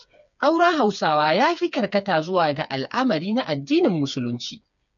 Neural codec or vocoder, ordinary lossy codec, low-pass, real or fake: codec, 16 kHz, 4 kbps, FreqCodec, smaller model; none; 7.2 kHz; fake